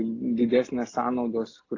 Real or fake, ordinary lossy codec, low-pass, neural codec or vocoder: real; AAC, 32 kbps; 7.2 kHz; none